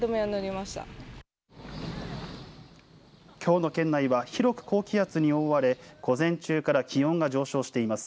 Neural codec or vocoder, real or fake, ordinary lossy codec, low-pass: none; real; none; none